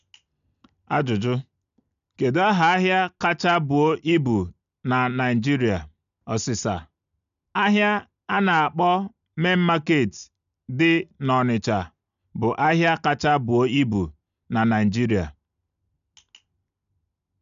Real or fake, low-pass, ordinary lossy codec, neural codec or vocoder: real; 7.2 kHz; none; none